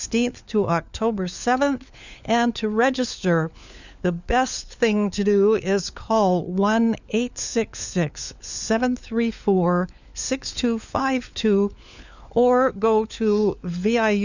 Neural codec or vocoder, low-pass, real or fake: codec, 16 kHz, 4 kbps, FunCodec, trained on LibriTTS, 50 frames a second; 7.2 kHz; fake